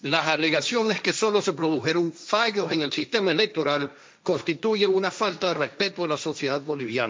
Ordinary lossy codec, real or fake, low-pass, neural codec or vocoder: none; fake; none; codec, 16 kHz, 1.1 kbps, Voila-Tokenizer